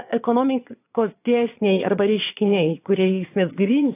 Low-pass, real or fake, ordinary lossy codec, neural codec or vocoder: 3.6 kHz; fake; AAC, 24 kbps; vocoder, 22.05 kHz, 80 mel bands, Vocos